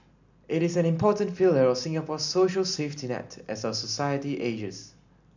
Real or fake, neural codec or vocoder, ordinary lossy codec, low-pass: real; none; none; 7.2 kHz